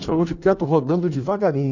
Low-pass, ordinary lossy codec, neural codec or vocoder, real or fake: 7.2 kHz; none; codec, 16 kHz in and 24 kHz out, 1.1 kbps, FireRedTTS-2 codec; fake